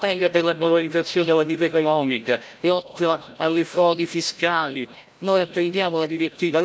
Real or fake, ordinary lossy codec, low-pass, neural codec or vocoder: fake; none; none; codec, 16 kHz, 0.5 kbps, FreqCodec, larger model